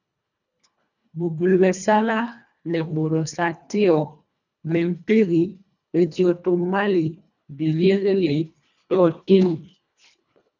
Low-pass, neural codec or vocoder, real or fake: 7.2 kHz; codec, 24 kHz, 1.5 kbps, HILCodec; fake